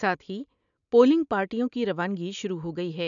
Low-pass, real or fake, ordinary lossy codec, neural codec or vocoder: 7.2 kHz; real; none; none